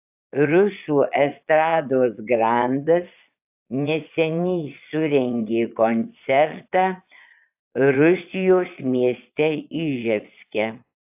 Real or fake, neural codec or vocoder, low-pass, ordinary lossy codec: fake; vocoder, 22.05 kHz, 80 mel bands, Vocos; 3.6 kHz; AAC, 32 kbps